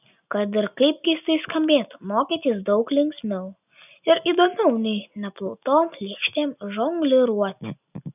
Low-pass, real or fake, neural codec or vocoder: 3.6 kHz; real; none